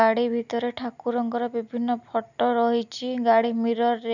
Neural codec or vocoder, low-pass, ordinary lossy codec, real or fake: none; 7.2 kHz; none; real